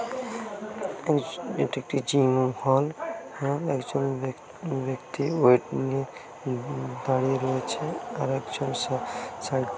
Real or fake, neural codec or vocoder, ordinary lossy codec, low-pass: real; none; none; none